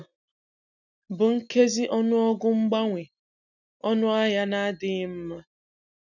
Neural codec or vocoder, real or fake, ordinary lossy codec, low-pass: none; real; none; 7.2 kHz